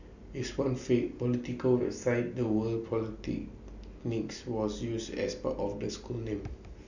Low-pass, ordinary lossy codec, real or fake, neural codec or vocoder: 7.2 kHz; none; real; none